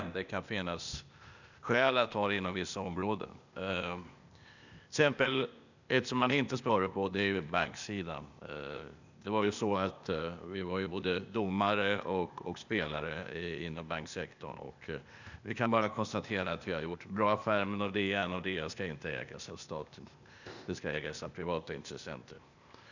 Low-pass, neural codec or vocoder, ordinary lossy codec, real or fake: 7.2 kHz; codec, 16 kHz, 0.8 kbps, ZipCodec; none; fake